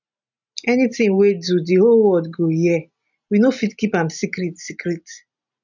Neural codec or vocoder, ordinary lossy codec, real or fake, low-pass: none; none; real; 7.2 kHz